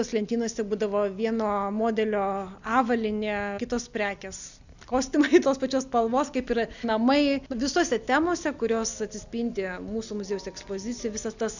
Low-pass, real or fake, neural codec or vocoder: 7.2 kHz; real; none